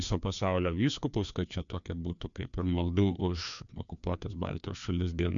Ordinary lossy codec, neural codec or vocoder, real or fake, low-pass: MP3, 96 kbps; codec, 16 kHz, 2 kbps, FreqCodec, larger model; fake; 7.2 kHz